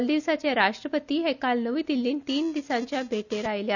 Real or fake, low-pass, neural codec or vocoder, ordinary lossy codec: real; 7.2 kHz; none; none